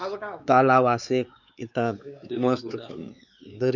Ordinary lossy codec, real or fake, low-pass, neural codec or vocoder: none; fake; 7.2 kHz; codec, 16 kHz, 4 kbps, X-Codec, WavLM features, trained on Multilingual LibriSpeech